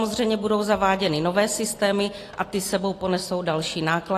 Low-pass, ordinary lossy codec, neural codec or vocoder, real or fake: 14.4 kHz; AAC, 48 kbps; none; real